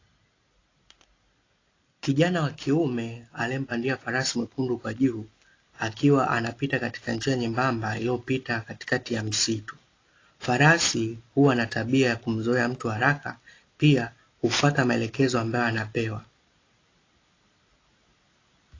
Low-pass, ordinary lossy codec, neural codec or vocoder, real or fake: 7.2 kHz; AAC, 32 kbps; none; real